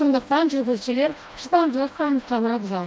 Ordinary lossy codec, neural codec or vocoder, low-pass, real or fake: none; codec, 16 kHz, 1 kbps, FreqCodec, smaller model; none; fake